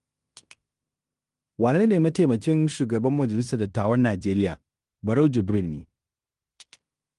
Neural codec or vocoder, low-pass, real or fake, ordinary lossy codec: codec, 16 kHz in and 24 kHz out, 0.9 kbps, LongCat-Audio-Codec, fine tuned four codebook decoder; 10.8 kHz; fake; Opus, 32 kbps